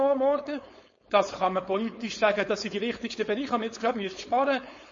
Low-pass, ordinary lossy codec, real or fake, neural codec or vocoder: 7.2 kHz; MP3, 32 kbps; fake; codec, 16 kHz, 4.8 kbps, FACodec